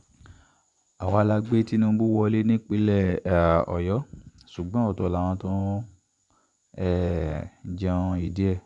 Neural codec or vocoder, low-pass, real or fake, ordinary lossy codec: vocoder, 24 kHz, 100 mel bands, Vocos; 10.8 kHz; fake; none